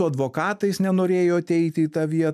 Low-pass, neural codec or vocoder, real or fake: 14.4 kHz; none; real